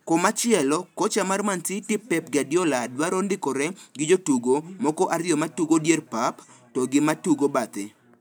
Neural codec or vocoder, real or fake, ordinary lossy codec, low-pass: none; real; none; none